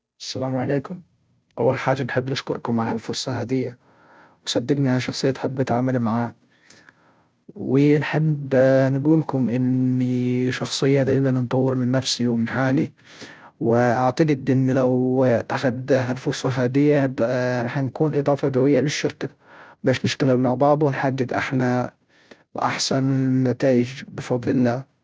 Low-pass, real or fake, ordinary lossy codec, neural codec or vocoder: none; fake; none; codec, 16 kHz, 0.5 kbps, FunCodec, trained on Chinese and English, 25 frames a second